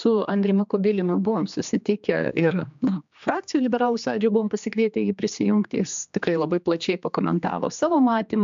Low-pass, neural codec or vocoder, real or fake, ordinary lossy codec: 7.2 kHz; codec, 16 kHz, 2 kbps, X-Codec, HuBERT features, trained on general audio; fake; MP3, 64 kbps